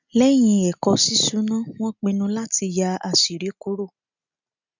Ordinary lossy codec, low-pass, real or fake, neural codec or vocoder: none; 7.2 kHz; real; none